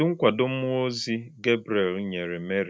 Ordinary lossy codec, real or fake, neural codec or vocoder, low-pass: none; real; none; none